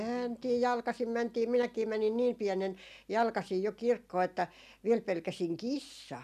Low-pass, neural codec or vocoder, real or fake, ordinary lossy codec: 14.4 kHz; none; real; none